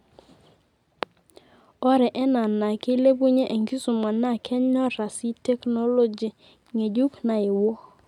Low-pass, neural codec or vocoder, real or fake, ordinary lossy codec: 19.8 kHz; none; real; none